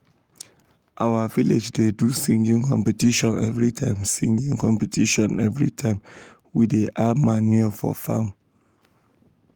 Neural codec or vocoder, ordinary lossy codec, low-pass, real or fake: codec, 44.1 kHz, 7.8 kbps, Pupu-Codec; Opus, 32 kbps; 19.8 kHz; fake